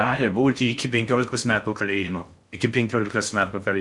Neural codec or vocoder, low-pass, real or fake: codec, 16 kHz in and 24 kHz out, 0.6 kbps, FocalCodec, streaming, 4096 codes; 10.8 kHz; fake